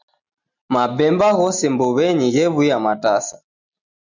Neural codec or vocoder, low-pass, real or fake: none; 7.2 kHz; real